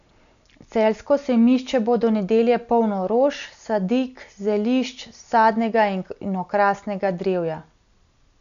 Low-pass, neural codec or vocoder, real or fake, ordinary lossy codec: 7.2 kHz; none; real; none